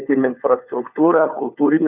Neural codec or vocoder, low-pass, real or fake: codec, 16 kHz, 4 kbps, FunCodec, trained on LibriTTS, 50 frames a second; 3.6 kHz; fake